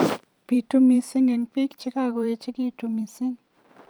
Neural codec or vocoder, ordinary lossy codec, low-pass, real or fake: vocoder, 44.1 kHz, 128 mel bands every 512 samples, BigVGAN v2; none; none; fake